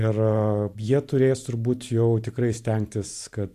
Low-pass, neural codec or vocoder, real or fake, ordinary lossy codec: 14.4 kHz; vocoder, 44.1 kHz, 128 mel bands every 512 samples, BigVGAN v2; fake; AAC, 64 kbps